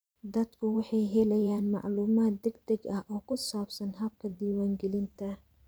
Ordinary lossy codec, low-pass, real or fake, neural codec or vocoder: none; none; fake; vocoder, 44.1 kHz, 128 mel bands every 512 samples, BigVGAN v2